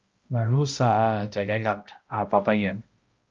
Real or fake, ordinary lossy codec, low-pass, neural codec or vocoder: fake; Opus, 32 kbps; 7.2 kHz; codec, 16 kHz, 0.5 kbps, X-Codec, HuBERT features, trained on balanced general audio